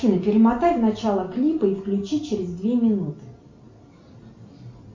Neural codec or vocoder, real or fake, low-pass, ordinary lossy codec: none; real; 7.2 kHz; MP3, 48 kbps